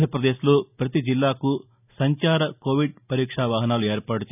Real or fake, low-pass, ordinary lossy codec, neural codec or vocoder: real; 3.6 kHz; none; none